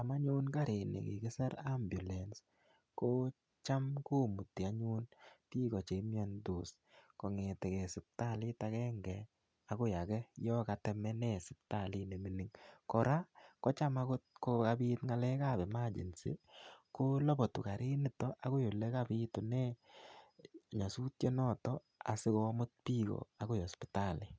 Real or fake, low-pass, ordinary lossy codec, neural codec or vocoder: real; none; none; none